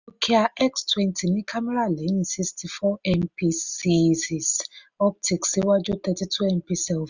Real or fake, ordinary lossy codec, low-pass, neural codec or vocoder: real; Opus, 64 kbps; 7.2 kHz; none